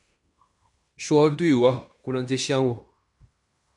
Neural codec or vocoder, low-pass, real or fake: codec, 16 kHz in and 24 kHz out, 0.9 kbps, LongCat-Audio-Codec, fine tuned four codebook decoder; 10.8 kHz; fake